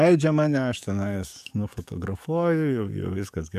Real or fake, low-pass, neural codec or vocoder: fake; 14.4 kHz; codec, 44.1 kHz, 7.8 kbps, DAC